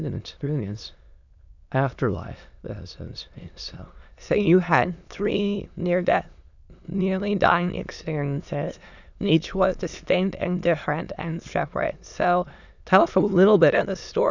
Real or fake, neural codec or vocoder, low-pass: fake; autoencoder, 22.05 kHz, a latent of 192 numbers a frame, VITS, trained on many speakers; 7.2 kHz